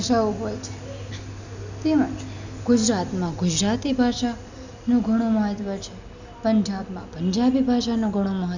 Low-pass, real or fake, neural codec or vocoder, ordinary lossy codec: 7.2 kHz; real; none; none